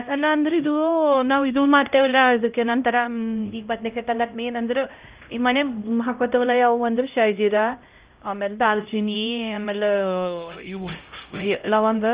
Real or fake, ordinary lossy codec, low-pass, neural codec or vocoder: fake; Opus, 24 kbps; 3.6 kHz; codec, 16 kHz, 0.5 kbps, X-Codec, HuBERT features, trained on LibriSpeech